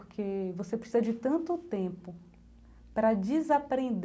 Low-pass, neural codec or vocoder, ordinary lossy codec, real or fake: none; none; none; real